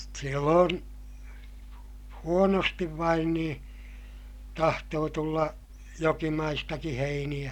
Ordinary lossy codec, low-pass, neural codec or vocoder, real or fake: none; 19.8 kHz; none; real